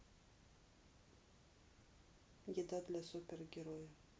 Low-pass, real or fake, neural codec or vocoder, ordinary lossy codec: none; real; none; none